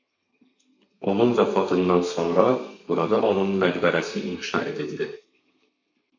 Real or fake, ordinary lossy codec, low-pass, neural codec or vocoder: fake; MP3, 48 kbps; 7.2 kHz; codec, 32 kHz, 1.9 kbps, SNAC